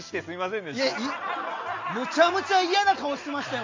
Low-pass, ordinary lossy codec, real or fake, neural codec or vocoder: 7.2 kHz; MP3, 48 kbps; real; none